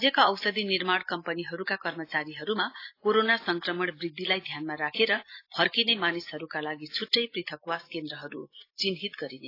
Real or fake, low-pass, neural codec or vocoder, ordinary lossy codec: real; 5.4 kHz; none; AAC, 32 kbps